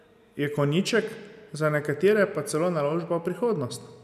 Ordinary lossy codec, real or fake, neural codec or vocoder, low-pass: none; real; none; 14.4 kHz